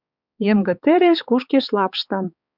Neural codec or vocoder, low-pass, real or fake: codec, 16 kHz, 4 kbps, X-Codec, HuBERT features, trained on balanced general audio; 5.4 kHz; fake